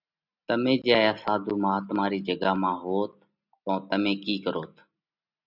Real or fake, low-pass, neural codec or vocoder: real; 5.4 kHz; none